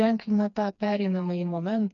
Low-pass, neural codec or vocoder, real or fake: 7.2 kHz; codec, 16 kHz, 2 kbps, FreqCodec, smaller model; fake